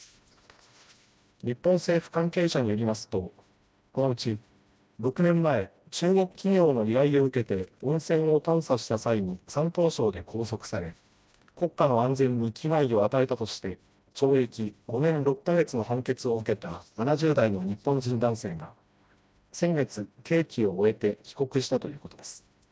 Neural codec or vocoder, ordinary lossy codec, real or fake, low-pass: codec, 16 kHz, 1 kbps, FreqCodec, smaller model; none; fake; none